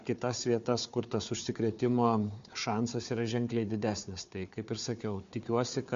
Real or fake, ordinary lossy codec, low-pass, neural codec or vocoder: fake; MP3, 48 kbps; 7.2 kHz; codec, 16 kHz, 4 kbps, FunCodec, trained on Chinese and English, 50 frames a second